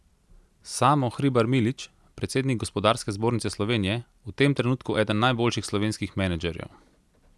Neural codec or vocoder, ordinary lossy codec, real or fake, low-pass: none; none; real; none